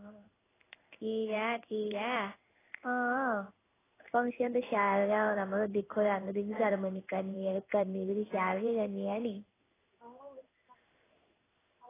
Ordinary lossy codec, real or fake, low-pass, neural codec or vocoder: AAC, 16 kbps; fake; 3.6 kHz; codec, 16 kHz in and 24 kHz out, 1 kbps, XY-Tokenizer